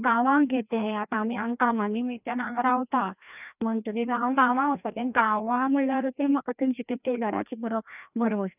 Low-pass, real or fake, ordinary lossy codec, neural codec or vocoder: 3.6 kHz; fake; none; codec, 16 kHz, 1 kbps, FreqCodec, larger model